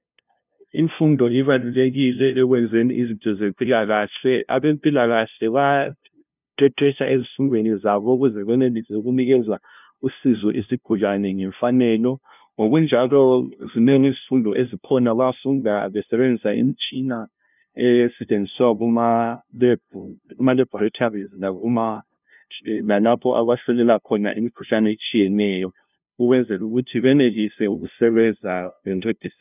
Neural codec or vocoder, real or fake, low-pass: codec, 16 kHz, 0.5 kbps, FunCodec, trained on LibriTTS, 25 frames a second; fake; 3.6 kHz